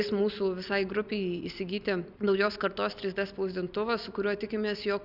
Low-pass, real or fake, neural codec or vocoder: 5.4 kHz; real; none